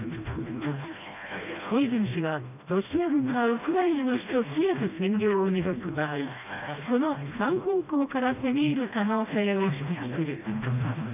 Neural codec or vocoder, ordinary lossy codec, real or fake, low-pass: codec, 16 kHz, 1 kbps, FreqCodec, smaller model; none; fake; 3.6 kHz